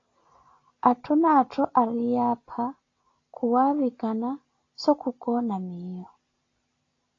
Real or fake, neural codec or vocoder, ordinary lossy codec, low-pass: real; none; AAC, 32 kbps; 7.2 kHz